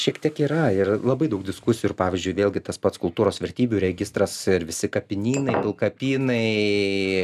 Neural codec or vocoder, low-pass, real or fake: none; 14.4 kHz; real